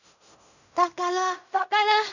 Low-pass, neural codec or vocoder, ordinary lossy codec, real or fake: 7.2 kHz; codec, 16 kHz in and 24 kHz out, 0.4 kbps, LongCat-Audio-Codec, fine tuned four codebook decoder; none; fake